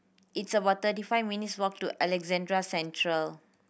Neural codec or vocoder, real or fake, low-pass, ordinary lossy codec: none; real; none; none